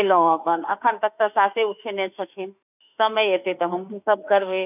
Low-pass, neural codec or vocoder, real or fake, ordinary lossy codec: 3.6 kHz; autoencoder, 48 kHz, 32 numbers a frame, DAC-VAE, trained on Japanese speech; fake; none